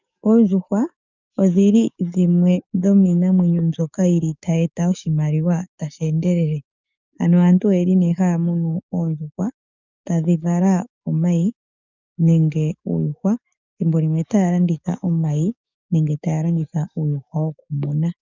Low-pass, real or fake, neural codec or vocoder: 7.2 kHz; fake; codec, 44.1 kHz, 7.8 kbps, DAC